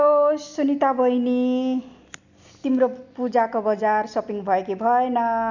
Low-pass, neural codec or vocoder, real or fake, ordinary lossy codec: 7.2 kHz; none; real; none